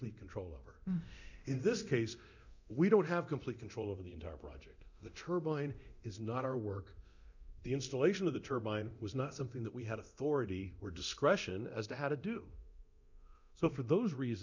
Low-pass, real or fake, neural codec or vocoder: 7.2 kHz; fake; codec, 24 kHz, 0.9 kbps, DualCodec